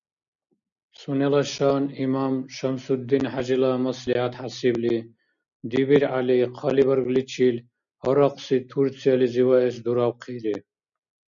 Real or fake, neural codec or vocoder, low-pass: real; none; 7.2 kHz